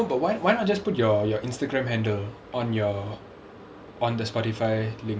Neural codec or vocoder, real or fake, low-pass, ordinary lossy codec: none; real; none; none